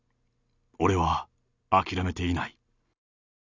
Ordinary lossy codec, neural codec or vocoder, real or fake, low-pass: none; none; real; 7.2 kHz